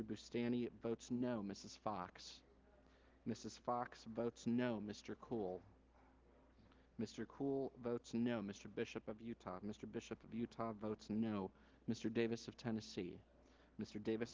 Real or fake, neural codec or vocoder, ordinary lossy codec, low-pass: real; none; Opus, 16 kbps; 7.2 kHz